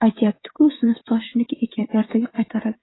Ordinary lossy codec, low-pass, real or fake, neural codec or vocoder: AAC, 16 kbps; 7.2 kHz; fake; codec, 16 kHz, 16 kbps, FreqCodec, smaller model